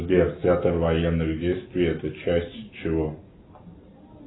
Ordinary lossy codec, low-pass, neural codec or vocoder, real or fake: AAC, 16 kbps; 7.2 kHz; none; real